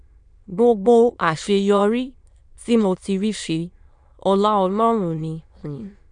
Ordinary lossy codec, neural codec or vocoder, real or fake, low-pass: none; autoencoder, 22.05 kHz, a latent of 192 numbers a frame, VITS, trained on many speakers; fake; 9.9 kHz